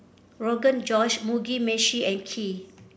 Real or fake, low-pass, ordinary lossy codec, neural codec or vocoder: real; none; none; none